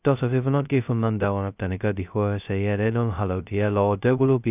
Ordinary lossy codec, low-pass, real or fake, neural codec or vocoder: none; 3.6 kHz; fake; codec, 16 kHz, 0.2 kbps, FocalCodec